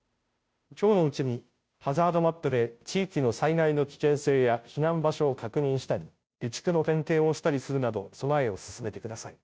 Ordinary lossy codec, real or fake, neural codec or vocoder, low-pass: none; fake; codec, 16 kHz, 0.5 kbps, FunCodec, trained on Chinese and English, 25 frames a second; none